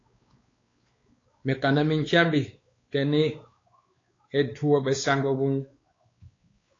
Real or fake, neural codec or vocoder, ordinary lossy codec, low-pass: fake; codec, 16 kHz, 4 kbps, X-Codec, WavLM features, trained on Multilingual LibriSpeech; AAC, 32 kbps; 7.2 kHz